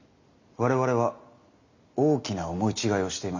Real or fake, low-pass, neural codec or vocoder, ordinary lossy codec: real; 7.2 kHz; none; none